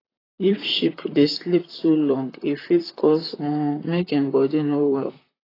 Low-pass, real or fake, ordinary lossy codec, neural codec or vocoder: 5.4 kHz; fake; AAC, 24 kbps; vocoder, 44.1 kHz, 128 mel bands, Pupu-Vocoder